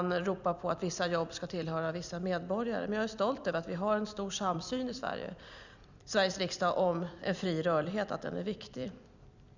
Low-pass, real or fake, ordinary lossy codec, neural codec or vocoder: 7.2 kHz; fake; none; vocoder, 44.1 kHz, 128 mel bands every 256 samples, BigVGAN v2